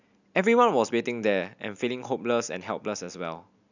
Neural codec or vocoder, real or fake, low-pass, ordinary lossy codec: none; real; 7.2 kHz; none